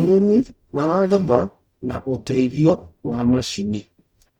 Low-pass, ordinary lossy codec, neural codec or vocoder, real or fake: 19.8 kHz; Opus, 64 kbps; codec, 44.1 kHz, 0.9 kbps, DAC; fake